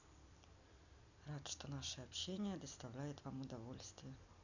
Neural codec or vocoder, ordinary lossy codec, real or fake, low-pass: none; none; real; 7.2 kHz